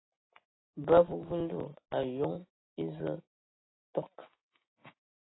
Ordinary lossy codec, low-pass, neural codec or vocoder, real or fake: AAC, 16 kbps; 7.2 kHz; none; real